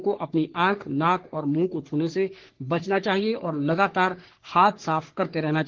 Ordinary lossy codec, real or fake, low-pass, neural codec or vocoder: Opus, 16 kbps; fake; 7.2 kHz; codec, 44.1 kHz, 3.4 kbps, Pupu-Codec